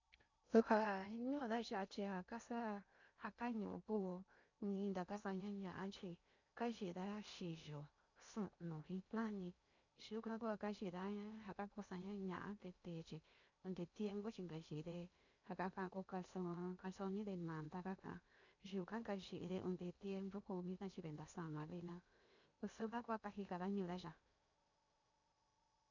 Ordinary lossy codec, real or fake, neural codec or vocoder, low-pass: none; fake; codec, 16 kHz in and 24 kHz out, 0.8 kbps, FocalCodec, streaming, 65536 codes; 7.2 kHz